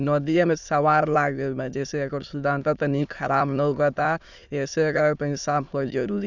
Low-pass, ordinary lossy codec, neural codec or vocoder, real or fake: 7.2 kHz; none; autoencoder, 22.05 kHz, a latent of 192 numbers a frame, VITS, trained on many speakers; fake